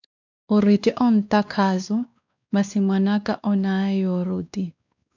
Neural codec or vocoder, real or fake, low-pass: codec, 16 kHz, 2 kbps, X-Codec, WavLM features, trained on Multilingual LibriSpeech; fake; 7.2 kHz